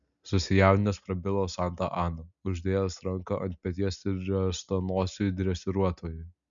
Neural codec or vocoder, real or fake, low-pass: none; real; 7.2 kHz